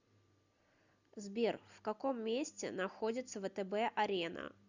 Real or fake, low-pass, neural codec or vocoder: real; 7.2 kHz; none